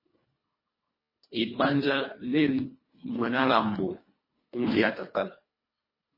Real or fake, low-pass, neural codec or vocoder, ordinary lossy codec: fake; 5.4 kHz; codec, 24 kHz, 1.5 kbps, HILCodec; MP3, 24 kbps